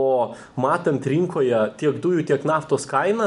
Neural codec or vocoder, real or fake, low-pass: none; real; 10.8 kHz